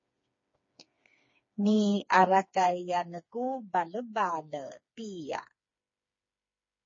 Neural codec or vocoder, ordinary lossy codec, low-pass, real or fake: codec, 16 kHz, 4 kbps, FreqCodec, smaller model; MP3, 32 kbps; 7.2 kHz; fake